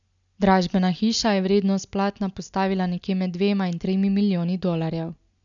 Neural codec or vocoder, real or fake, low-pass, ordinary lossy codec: none; real; 7.2 kHz; none